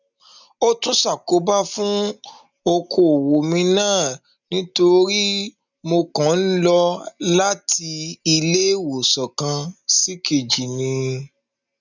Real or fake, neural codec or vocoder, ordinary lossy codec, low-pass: real; none; none; 7.2 kHz